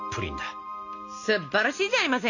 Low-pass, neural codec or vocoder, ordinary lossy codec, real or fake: 7.2 kHz; none; none; real